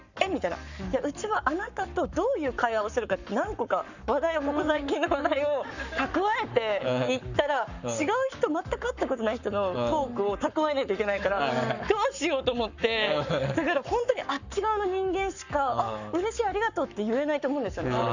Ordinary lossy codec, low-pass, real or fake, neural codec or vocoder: none; 7.2 kHz; fake; codec, 44.1 kHz, 7.8 kbps, Pupu-Codec